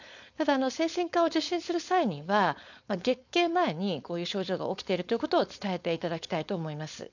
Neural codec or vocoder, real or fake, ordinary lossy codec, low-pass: codec, 16 kHz, 4.8 kbps, FACodec; fake; none; 7.2 kHz